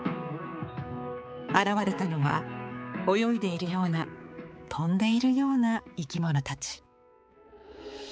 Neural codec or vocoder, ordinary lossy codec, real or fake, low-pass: codec, 16 kHz, 4 kbps, X-Codec, HuBERT features, trained on balanced general audio; none; fake; none